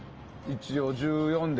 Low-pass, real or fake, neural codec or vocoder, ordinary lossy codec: 7.2 kHz; real; none; Opus, 24 kbps